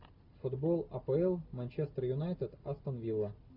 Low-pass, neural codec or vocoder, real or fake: 5.4 kHz; none; real